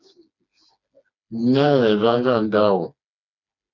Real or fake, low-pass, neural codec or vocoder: fake; 7.2 kHz; codec, 16 kHz, 2 kbps, FreqCodec, smaller model